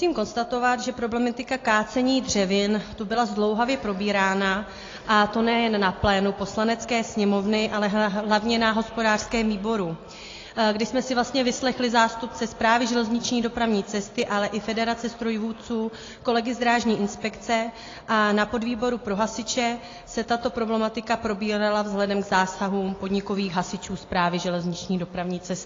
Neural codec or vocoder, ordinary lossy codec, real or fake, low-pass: none; AAC, 32 kbps; real; 7.2 kHz